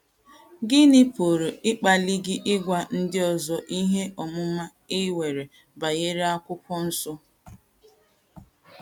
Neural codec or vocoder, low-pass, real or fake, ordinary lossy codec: none; 19.8 kHz; real; none